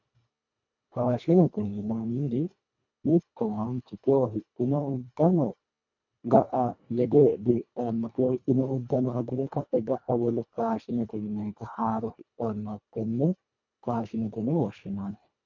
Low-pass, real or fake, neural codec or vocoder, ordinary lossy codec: 7.2 kHz; fake; codec, 24 kHz, 1.5 kbps, HILCodec; MP3, 64 kbps